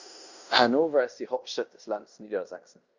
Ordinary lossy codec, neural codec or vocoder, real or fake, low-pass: Opus, 64 kbps; codec, 16 kHz in and 24 kHz out, 0.9 kbps, LongCat-Audio-Codec, fine tuned four codebook decoder; fake; 7.2 kHz